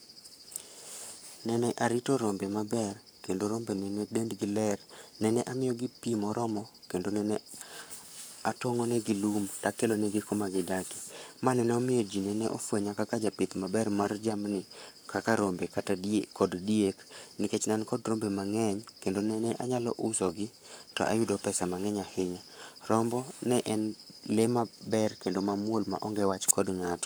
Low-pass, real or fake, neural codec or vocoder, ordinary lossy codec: none; fake; codec, 44.1 kHz, 7.8 kbps, Pupu-Codec; none